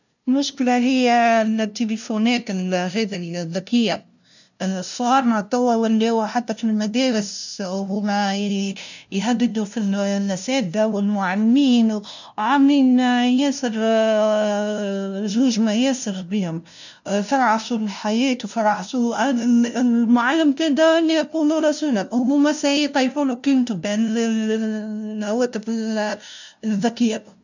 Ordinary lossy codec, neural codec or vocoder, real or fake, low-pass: none; codec, 16 kHz, 0.5 kbps, FunCodec, trained on LibriTTS, 25 frames a second; fake; 7.2 kHz